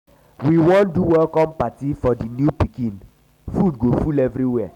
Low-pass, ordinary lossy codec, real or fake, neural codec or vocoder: 19.8 kHz; none; real; none